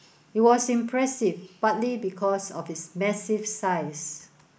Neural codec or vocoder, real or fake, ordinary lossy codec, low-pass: none; real; none; none